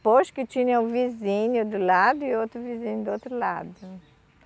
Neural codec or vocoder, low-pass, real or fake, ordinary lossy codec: none; none; real; none